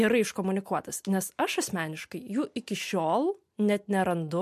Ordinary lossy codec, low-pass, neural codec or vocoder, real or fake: MP3, 64 kbps; 14.4 kHz; none; real